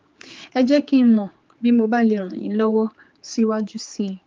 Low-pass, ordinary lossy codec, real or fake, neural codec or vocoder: 7.2 kHz; Opus, 32 kbps; fake; codec, 16 kHz, 4 kbps, X-Codec, HuBERT features, trained on general audio